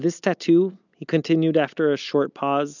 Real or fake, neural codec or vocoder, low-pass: real; none; 7.2 kHz